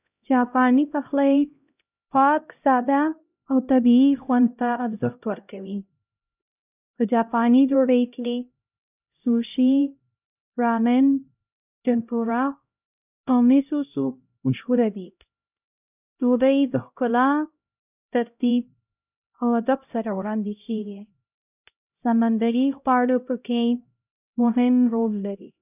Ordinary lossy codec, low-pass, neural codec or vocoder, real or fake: none; 3.6 kHz; codec, 16 kHz, 0.5 kbps, X-Codec, HuBERT features, trained on LibriSpeech; fake